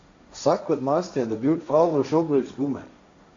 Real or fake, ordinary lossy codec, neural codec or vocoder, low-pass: fake; AAC, 48 kbps; codec, 16 kHz, 1.1 kbps, Voila-Tokenizer; 7.2 kHz